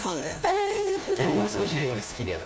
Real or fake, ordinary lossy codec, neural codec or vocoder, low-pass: fake; none; codec, 16 kHz, 1 kbps, FunCodec, trained on LibriTTS, 50 frames a second; none